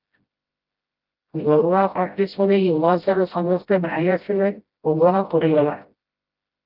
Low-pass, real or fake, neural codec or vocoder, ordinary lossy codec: 5.4 kHz; fake; codec, 16 kHz, 0.5 kbps, FreqCodec, smaller model; Opus, 32 kbps